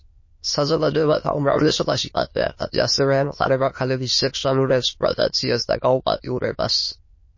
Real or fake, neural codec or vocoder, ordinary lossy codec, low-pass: fake; autoencoder, 22.05 kHz, a latent of 192 numbers a frame, VITS, trained on many speakers; MP3, 32 kbps; 7.2 kHz